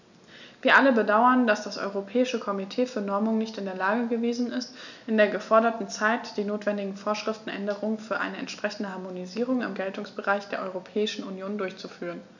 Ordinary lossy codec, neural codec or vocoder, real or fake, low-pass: none; none; real; 7.2 kHz